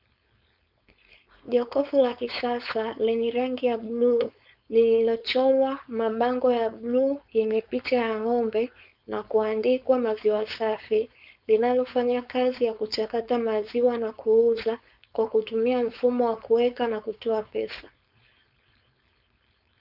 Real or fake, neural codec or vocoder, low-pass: fake; codec, 16 kHz, 4.8 kbps, FACodec; 5.4 kHz